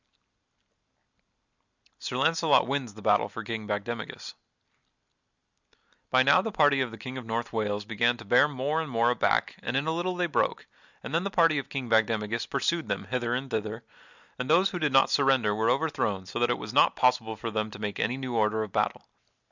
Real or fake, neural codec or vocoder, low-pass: real; none; 7.2 kHz